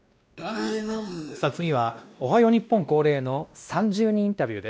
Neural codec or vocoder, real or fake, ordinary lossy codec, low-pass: codec, 16 kHz, 1 kbps, X-Codec, WavLM features, trained on Multilingual LibriSpeech; fake; none; none